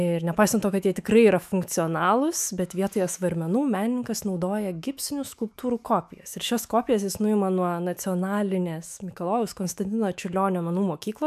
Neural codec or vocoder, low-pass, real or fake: autoencoder, 48 kHz, 128 numbers a frame, DAC-VAE, trained on Japanese speech; 14.4 kHz; fake